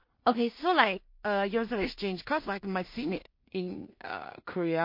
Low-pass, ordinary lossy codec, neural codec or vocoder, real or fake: 5.4 kHz; MP3, 32 kbps; codec, 16 kHz in and 24 kHz out, 0.4 kbps, LongCat-Audio-Codec, two codebook decoder; fake